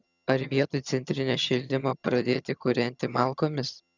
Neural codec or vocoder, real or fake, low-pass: vocoder, 22.05 kHz, 80 mel bands, HiFi-GAN; fake; 7.2 kHz